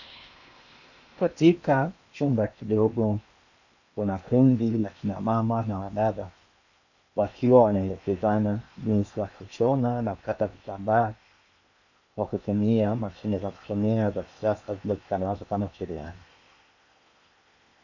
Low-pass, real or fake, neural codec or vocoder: 7.2 kHz; fake; codec, 16 kHz in and 24 kHz out, 0.8 kbps, FocalCodec, streaming, 65536 codes